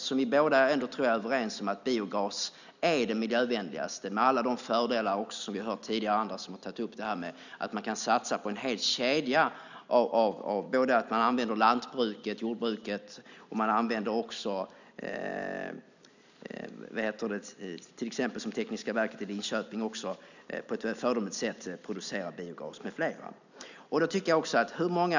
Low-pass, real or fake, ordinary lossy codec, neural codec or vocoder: 7.2 kHz; real; none; none